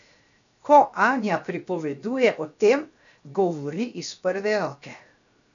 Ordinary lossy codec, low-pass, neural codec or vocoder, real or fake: none; 7.2 kHz; codec, 16 kHz, 0.7 kbps, FocalCodec; fake